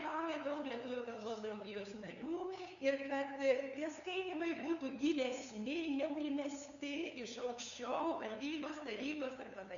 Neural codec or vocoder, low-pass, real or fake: codec, 16 kHz, 2 kbps, FunCodec, trained on LibriTTS, 25 frames a second; 7.2 kHz; fake